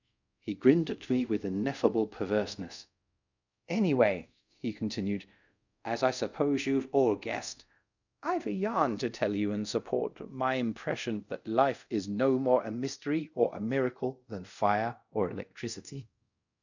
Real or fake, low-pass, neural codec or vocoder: fake; 7.2 kHz; codec, 24 kHz, 0.5 kbps, DualCodec